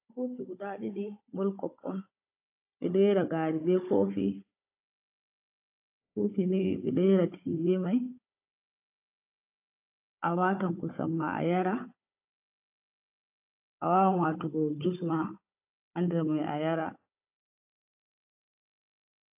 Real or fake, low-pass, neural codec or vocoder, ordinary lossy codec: fake; 3.6 kHz; codec, 16 kHz, 16 kbps, FunCodec, trained on Chinese and English, 50 frames a second; AAC, 32 kbps